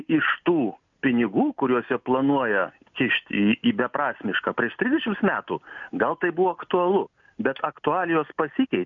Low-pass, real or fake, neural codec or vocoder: 7.2 kHz; real; none